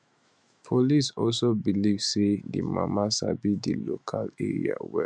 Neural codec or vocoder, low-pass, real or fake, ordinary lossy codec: autoencoder, 48 kHz, 128 numbers a frame, DAC-VAE, trained on Japanese speech; 9.9 kHz; fake; none